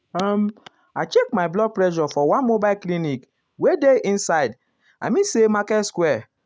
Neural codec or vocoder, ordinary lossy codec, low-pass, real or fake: none; none; none; real